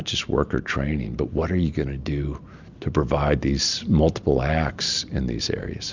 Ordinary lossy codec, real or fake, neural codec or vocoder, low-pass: Opus, 64 kbps; real; none; 7.2 kHz